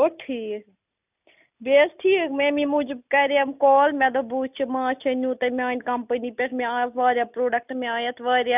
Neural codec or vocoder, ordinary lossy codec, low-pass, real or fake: none; none; 3.6 kHz; real